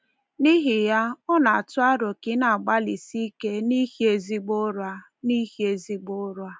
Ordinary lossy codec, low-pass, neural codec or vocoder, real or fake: none; none; none; real